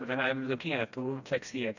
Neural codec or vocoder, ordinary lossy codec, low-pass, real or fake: codec, 16 kHz, 1 kbps, FreqCodec, smaller model; none; 7.2 kHz; fake